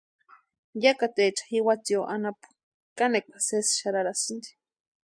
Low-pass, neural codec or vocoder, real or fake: 9.9 kHz; none; real